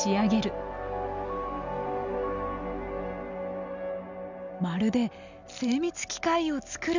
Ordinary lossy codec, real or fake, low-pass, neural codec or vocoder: none; real; 7.2 kHz; none